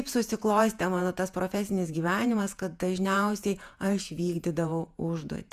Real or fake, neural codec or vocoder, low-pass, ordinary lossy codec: fake; vocoder, 48 kHz, 128 mel bands, Vocos; 14.4 kHz; Opus, 64 kbps